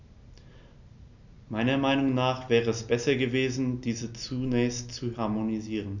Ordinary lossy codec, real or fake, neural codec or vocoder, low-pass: none; real; none; 7.2 kHz